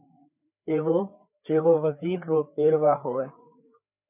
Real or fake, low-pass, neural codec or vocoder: fake; 3.6 kHz; codec, 16 kHz, 4 kbps, FreqCodec, larger model